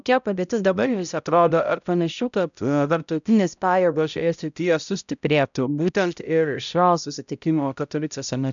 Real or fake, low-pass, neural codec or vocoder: fake; 7.2 kHz; codec, 16 kHz, 0.5 kbps, X-Codec, HuBERT features, trained on balanced general audio